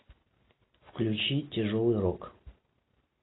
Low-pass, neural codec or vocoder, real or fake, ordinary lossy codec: 7.2 kHz; none; real; AAC, 16 kbps